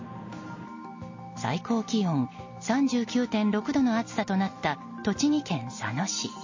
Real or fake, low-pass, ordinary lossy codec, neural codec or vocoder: real; 7.2 kHz; MP3, 32 kbps; none